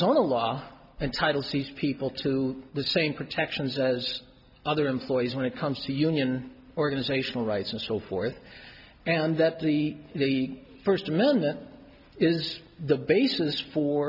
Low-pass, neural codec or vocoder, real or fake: 5.4 kHz; none; real